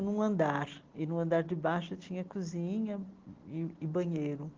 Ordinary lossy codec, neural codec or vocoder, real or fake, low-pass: Opus, 16 kbps; none; real; 7.2 kHz